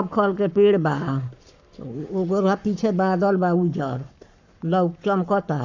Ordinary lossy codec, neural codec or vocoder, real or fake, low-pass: none; codec, 44.1 kHz, 7.8 kbps, Pupu-Codec; fake; 7.2 kHz